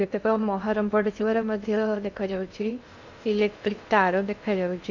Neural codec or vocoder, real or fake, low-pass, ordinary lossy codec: codec, 16 kHz in and 24 kHz out, 0.6 kbps, FocalCodec, streaming, 2048 codes; fake; 7.2 kHz; none